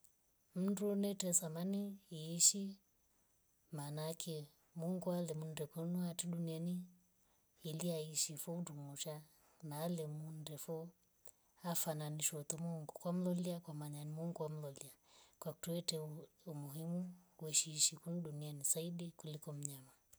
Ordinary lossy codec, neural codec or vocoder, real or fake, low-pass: none; none; real; none